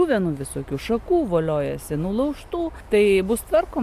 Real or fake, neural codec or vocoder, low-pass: real; none; 14.4 kHz